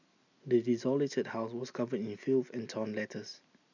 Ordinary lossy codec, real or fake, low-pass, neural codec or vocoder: none; real; 7.2 kHz; none